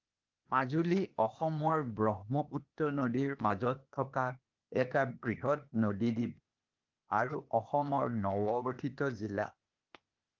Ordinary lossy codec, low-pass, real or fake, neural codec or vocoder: Opus, 24 kbps; 7.2 kHz; fake; codec, 16 kHz, 0.8 kbps, ZipCodec